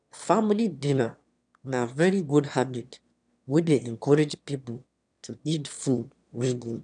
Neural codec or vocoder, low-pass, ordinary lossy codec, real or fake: autoencoder, 22.05 kHz, a latent of 192 numbers a frame, VITS, trained on one speaker; 9.9 kHz; none; fake